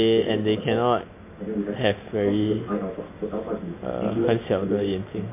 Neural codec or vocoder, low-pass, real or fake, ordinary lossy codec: none; 3.6 kHz; real; MP3, 24 kbps